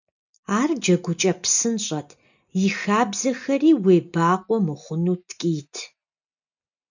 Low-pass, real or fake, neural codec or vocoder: 7.2 kHz; real; none